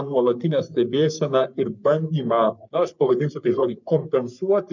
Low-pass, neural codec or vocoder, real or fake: 7.2 kHz; codec, 44.1 kHz, 3.4 kbps, Pupu-Codec; fake